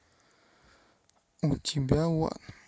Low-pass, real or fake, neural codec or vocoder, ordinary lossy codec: none; real; none; none